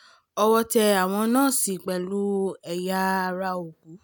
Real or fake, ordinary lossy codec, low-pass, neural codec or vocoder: real; none; none; none